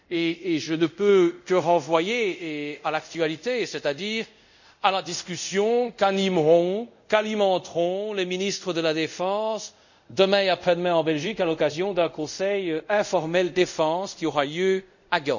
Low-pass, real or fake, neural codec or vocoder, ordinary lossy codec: 7.2 kHz; fake; codec, 24 kHz, 0.5 kbps, DualCodec; none